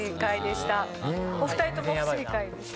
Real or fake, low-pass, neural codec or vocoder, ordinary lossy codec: real; none; none; none